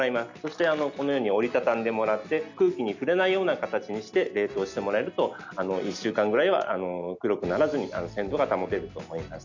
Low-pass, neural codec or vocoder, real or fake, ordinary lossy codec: 7.2 kHz; none; real; none